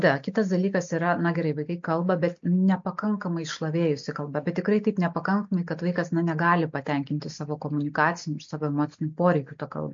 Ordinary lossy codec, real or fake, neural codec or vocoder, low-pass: MP3, 48 kbps; real; none; 7.2 kHz